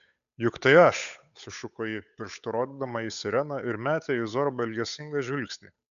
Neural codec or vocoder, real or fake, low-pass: codec, 16 kHz, 8 kbps, FunCodec, trained on Chinese and English, 25 frames a second; fake; 7.2 kHz